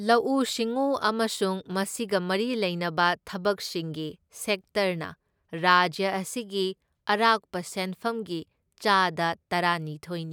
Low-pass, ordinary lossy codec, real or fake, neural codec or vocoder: none; none; real; none